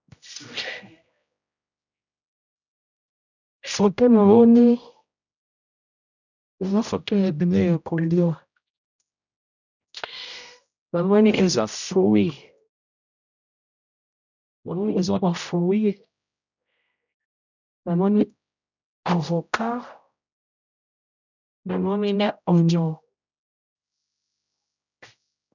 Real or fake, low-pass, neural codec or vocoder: fake; 7.2 kHz; codec, 16 kHz, 0.5 kbps, X-Codec, HuBERT features, trained on general audio